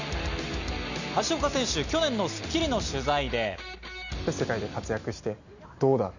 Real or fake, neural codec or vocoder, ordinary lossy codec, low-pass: real; none; none; 7.2 kHz